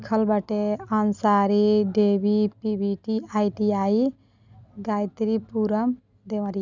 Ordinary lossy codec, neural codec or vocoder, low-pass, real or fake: none; none; 7.2 kHz; real